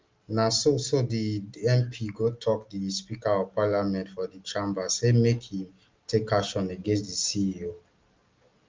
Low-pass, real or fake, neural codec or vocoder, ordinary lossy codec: 7.2 kHz; real; none; Opus, 32 kbps